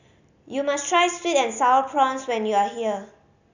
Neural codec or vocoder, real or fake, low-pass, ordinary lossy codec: none; real; 7.2 kHz; none